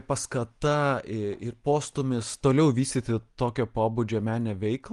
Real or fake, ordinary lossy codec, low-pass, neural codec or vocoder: real; Opus, 24 kbps; 10.8 kHz; none